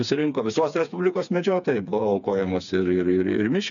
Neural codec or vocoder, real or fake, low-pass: codec, 16 kHz, 4 kbps, FreqCodec, smaller model; fake; 7.2 kHz